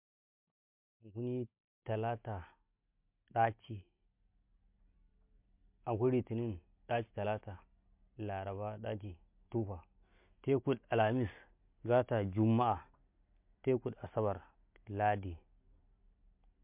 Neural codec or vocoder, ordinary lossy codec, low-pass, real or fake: none; MP3, 32 kbps; 3.6 kHz; real